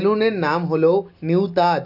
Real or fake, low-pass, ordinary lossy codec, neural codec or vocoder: real; 5.4 kHz; AAC, 48 kbps; none